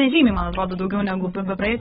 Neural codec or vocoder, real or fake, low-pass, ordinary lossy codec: codec, 16 kHz, 16 kbps, FreqCodec, larger model; fake; 7.2 kHz; AAC, 16 kbps